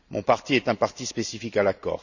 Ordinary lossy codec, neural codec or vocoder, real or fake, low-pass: none; none; real; 7.2 kHz